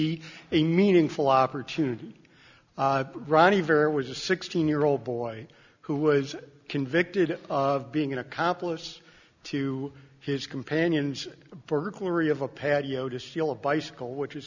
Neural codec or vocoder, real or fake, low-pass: none; real; 7.2 kHz